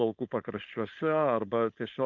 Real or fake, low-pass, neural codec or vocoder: fake; 7.2 kHz; codec, 16 kHz, 4.8 kbps, FACodec